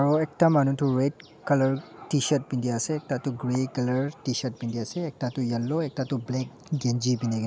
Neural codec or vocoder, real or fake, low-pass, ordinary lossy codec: none; real; none; none